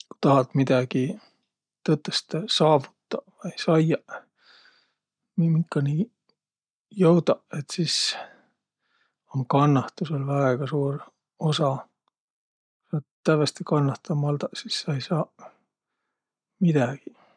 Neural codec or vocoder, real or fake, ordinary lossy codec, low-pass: none; real; none; 9.9 kHz